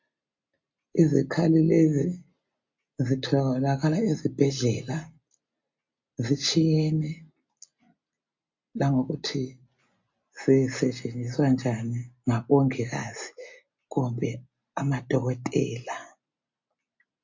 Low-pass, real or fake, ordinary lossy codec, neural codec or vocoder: 7.2 kHz; real; MP3, 48 kbps; none